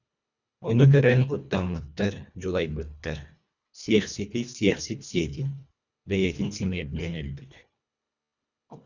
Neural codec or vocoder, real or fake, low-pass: codec, 24 kHz, 1.5 kbps, HILCodec; fake; 7.2 kHz